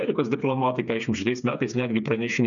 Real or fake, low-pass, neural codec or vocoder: fake; 7.2 kHz; codec, 16 kHz, 4 kbps, FreqCodec, smaller model